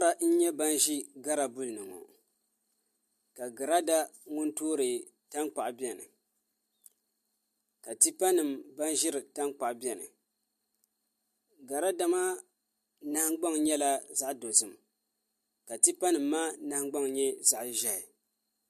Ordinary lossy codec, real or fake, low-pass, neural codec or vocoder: MP3, 64 kbps; real; 14.4 kHz; none